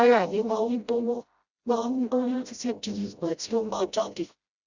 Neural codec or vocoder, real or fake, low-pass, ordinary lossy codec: codec, 16 kHz, 0.5 kbps, FreqCodec, smaller model; fake; 7.2 kHz; Opus, 64 kbps